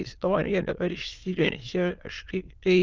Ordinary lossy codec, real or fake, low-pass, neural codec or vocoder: Opus, 24 kbps; fake; 7.2 kHz; autoencoder, 22.05 kHz, a latent of 192 numbers a frame, VITS, trained on many speakers